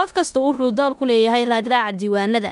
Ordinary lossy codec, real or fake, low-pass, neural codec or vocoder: MP3, 96 kbps; fake; 10.8 kHz; codec, 16 kHz in and 24 kHz out, 0.9 kbps, LongCat-Audio-Codec, four codebook decoder